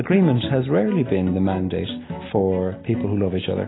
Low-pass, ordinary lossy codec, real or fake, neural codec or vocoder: 7.2 kHz; AAC, 16 kbps; real; none